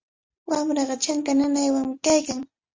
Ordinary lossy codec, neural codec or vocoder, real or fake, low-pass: Opus, 32 kbps; none; real; 7.2 kHz